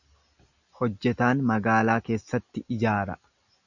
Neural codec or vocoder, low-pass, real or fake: none; 7.2 kHz; real